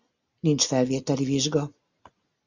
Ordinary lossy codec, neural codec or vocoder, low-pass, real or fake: Opus, 64 kbps; none; 7.2 kHz; real